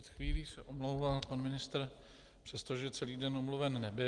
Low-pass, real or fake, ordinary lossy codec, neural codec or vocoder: 10.8 kHz; real; Opus, 24 kbps; none